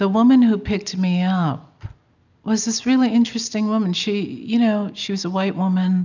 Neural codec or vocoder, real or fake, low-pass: none; real; 7.2 kHz